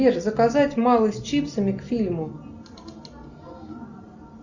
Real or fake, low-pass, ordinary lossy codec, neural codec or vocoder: real; 7.2 kHz; Opus, 64 kbps; none